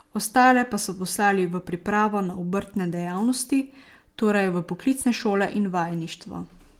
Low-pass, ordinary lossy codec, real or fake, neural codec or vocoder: 19.8 kHz; Opus, 24 kbps; real; none